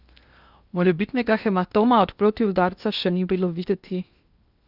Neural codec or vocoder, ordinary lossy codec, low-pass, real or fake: codec, 16 kHz in and 24 kHz out, 0.8 kbps, FocalCodec, streaming, 65536 codes; Opus, 64 kbps; 5.4 kHz; fake